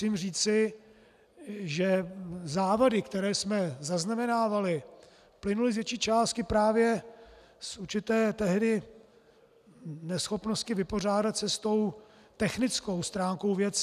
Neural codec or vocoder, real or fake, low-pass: none; real; 14.4 kHz